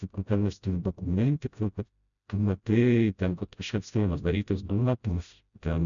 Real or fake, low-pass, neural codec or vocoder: fake; 7.2 kHz; codec, 16 kHz, 0.5 kbps, FreqCodec, smaller model